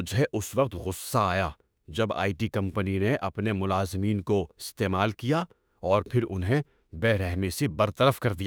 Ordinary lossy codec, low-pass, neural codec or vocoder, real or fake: none; none; autoencoder, 48 kHz, 32 numbers a frame, DAC-VAE, trained on Japanese speech; fake